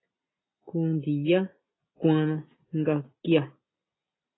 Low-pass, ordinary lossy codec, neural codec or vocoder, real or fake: 7.2 kHz; AAC, 16 kbps; none; real